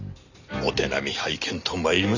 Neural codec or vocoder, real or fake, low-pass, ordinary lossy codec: none; real; 7.2 kHz; none